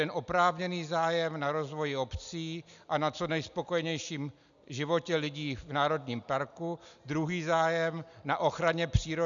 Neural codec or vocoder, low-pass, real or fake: none; 7.2 kHz; real